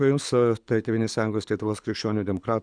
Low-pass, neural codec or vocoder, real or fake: 9.9 kHz; codec, 24 kHz, 6 kbps, HILCodec; fake